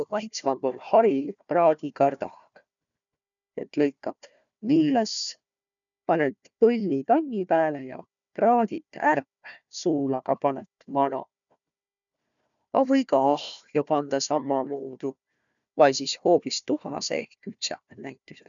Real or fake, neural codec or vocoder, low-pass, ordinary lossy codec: fake; codec, 16 kHz, 1 kbps, FunCodec, trained on Chinese and English, 50 frames a second; 7.2 kHz; none